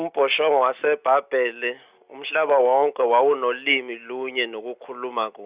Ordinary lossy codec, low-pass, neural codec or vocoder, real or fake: Opus, 64 kbps; 3.6 kHz; none; real